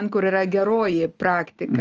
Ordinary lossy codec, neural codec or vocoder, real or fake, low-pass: Opus, 24 kbps; none; real; 7.2 kHz